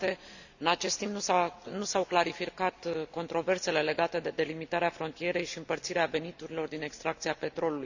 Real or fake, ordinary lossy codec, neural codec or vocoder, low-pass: real; none; none; 7.2 kHz